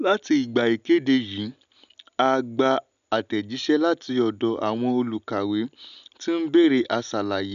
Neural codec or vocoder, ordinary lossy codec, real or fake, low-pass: none; none; real; 7.2 kHz